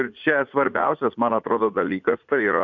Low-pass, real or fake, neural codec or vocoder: 7.2 kHz; fake; vocoder, 44.1 kHz, 80 mel bands, Vocos